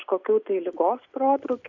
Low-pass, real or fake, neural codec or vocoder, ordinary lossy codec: 7.2 kHz; real; none; MP3, 48 kbps